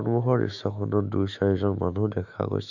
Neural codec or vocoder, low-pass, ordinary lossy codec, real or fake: autoencoder, 48 kHz, 128 numbers a frame, DAC-VAE, trained on Japanese speech; 7.2 kHz; none; fake